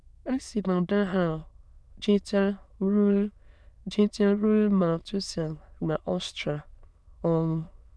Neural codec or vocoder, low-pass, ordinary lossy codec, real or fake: autoencoder, 22.05 kHz, a latent of 192 numbers a frame, VITS, trained on many speakers; none; none; fake